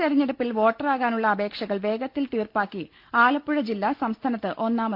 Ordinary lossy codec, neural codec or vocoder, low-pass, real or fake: Opus, 32 kbps; none; 5.4 kHz; real